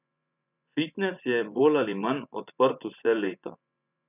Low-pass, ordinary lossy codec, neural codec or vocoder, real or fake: 3.6 kHz; none; vocoder, 24 kHz, 100 mel bands, Vocos; fake